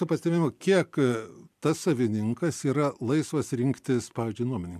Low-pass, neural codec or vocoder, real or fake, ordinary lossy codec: 14.4 kHz; vocoder, 44.1 kHz, 128 mel bands every 256 samples, BigVGAN v2; fake; MP3, 96 kbps